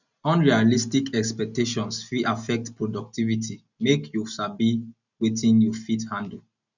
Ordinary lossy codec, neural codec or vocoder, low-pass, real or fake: none; none; 7.2 kHz; real